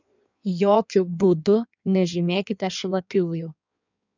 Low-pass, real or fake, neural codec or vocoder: 7.2 kHz; fake; codec, 16 kHz in and 24 kHz out, 1.1 kbps, FireRedTTS-2 codec